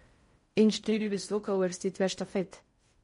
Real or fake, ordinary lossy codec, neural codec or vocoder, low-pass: fake; MP3, 48 kbps; codec, 16 kHz in and 24 kHz out, 0.6 kbps, FocalCodec, streaming, 4096 codes; 10.8 kHz